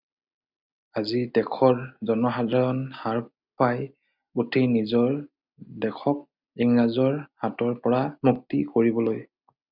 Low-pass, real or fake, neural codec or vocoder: 5.4 kHz; real; none